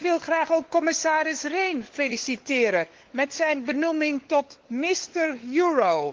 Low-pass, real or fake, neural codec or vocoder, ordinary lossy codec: 7.2 kHz; fake; codec, 16 kHz, 4 kbps, FunCodec, trained on LibriTTS, 50 frames a second; Opus, 16 kbps